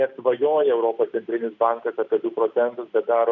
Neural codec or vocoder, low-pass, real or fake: none; 7.2 kHz; real